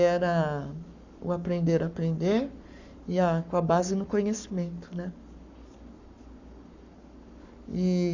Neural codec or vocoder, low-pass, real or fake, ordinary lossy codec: codec, 44.1 kHz, 7.8 kbps, Pupu-Codec; 7.2 kHz; fake; none